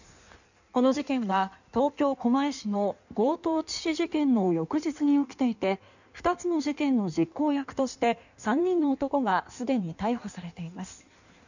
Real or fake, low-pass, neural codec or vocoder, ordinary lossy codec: fake; 7.2 kHz; codec, 16 kHz in and 24 kHz out, 1.1 kbps, FireRedTTS-2 codec; none